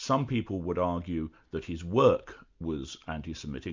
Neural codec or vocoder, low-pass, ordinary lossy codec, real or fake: none; 7.2 kHz; MP3, 64 kbps; real